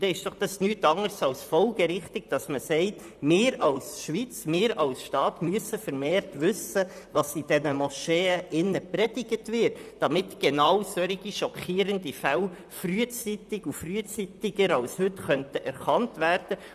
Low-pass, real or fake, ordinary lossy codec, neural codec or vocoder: 14.4 kHz; fake; none; vocoder, 44.1 kHz, 128 mel bands, Pupu-Vocoder